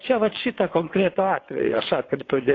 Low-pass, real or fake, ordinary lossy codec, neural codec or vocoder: 7.2 kHz; fake; AAC, 32 kbps; vocoder, 22.05 kHz, 80 mel bands, WaveNeXt